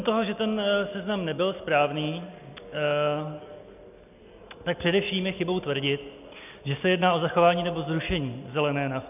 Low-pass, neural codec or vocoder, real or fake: 3.6 kHz; none; real